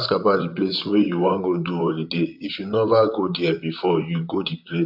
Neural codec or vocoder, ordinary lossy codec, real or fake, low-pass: vocoder, 44.1 kHz, 128 mel bands, Pupu-Vocoder; AAC, 48 kbps; fake; 5.4 kHz